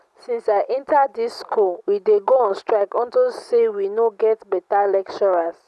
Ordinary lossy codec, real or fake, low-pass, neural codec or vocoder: none; real; none; none